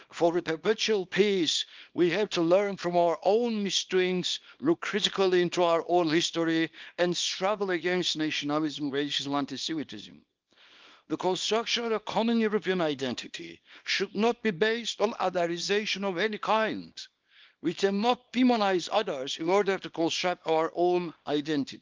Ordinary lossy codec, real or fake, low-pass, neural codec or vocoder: Opus, 32 kbps; fake; 7.2 kHz; codec, 24 kHz, 0.9 kbps, WavTokenizer, small release